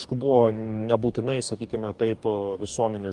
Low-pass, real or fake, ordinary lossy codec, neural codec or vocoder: 10.8 kHz; fake; Opus, 32 kbps; codec, 44.1 kHz, 2.6 kbps, DAC